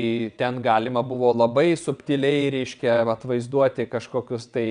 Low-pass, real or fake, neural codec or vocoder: 9.9 kHz; fake; vocoder, 22.05 kHz, 80 mel bands, WaveNeXt